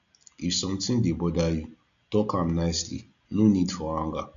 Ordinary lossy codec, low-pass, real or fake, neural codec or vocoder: none; 7.2 kHz; real; none